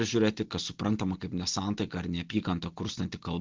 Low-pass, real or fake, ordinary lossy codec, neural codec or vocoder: 7.2 kHz; real; Opus, 16 kbps; none